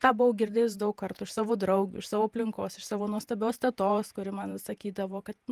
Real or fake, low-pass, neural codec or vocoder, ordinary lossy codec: fake; 14.4 kHz; vocoder, 48 kHz, 128 mel bands, Vocos; Opus, 32 kbps